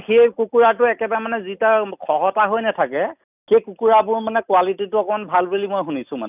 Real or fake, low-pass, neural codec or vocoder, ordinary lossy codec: real; 3.6 kHz; none; none